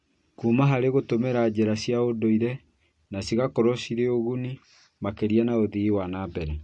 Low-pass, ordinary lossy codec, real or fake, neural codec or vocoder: 10.8 kHz; MP3, 48 kbps; real; none